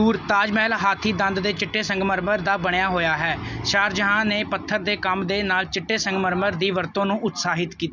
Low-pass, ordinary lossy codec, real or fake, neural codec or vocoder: 7.2 kHz; none; real; none